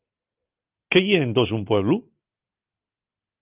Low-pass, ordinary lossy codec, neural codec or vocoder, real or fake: 3.6 kHz; Opus, 24 kbps; none; real